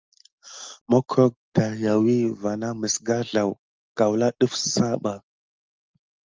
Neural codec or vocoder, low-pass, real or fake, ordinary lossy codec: none; 7.2 kHz; real; Opus, 32 kbps